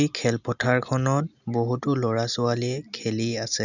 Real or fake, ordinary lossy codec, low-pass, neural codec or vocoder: real; none; 7.2 kHz; none